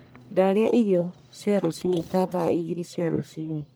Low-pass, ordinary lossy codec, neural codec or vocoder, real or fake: none; none; codec, 44.1 kHz, 1.7 kbps, Pupu-Codec; fake